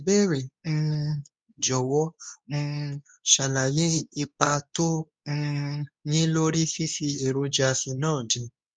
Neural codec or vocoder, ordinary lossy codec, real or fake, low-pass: codec, 24 kHz, 0.9 kbps, WavTokenizer, medium speech release version 2; none; fake; 9.9 kHz